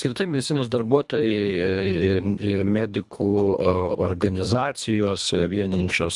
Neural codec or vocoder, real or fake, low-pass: codec, 24 kHz, 1.5 kbps, HILCodec; fake; 10.8 kHz